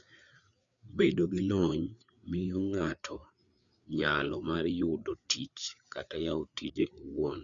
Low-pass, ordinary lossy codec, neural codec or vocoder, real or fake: 7.2 kHz; none; codec, 16 kHz, 4 kbps, FreqCodec, larger model; fake